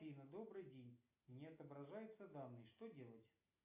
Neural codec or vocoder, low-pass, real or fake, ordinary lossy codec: none; 3.6 kHz; real; AAC, 32 kbps